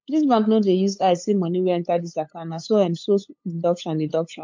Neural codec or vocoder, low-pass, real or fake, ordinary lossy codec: codec, 16 kHz, 8 kbps, FunCodec, trained on LibriTTS, 25 frames a second; 7.2 kHz; fake; MP3, 48 kbps